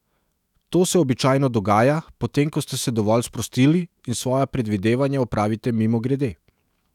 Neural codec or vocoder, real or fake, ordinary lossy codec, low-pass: autoencoder, 48 kHz, 128 numbers a frame, DAC-VAE, trained on Japanese speech; fake; none; 19.8 kHz